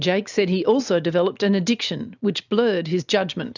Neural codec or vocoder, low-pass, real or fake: none; 7.2 kHz; real